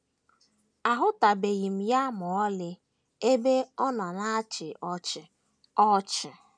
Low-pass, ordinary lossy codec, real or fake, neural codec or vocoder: none; none; real; none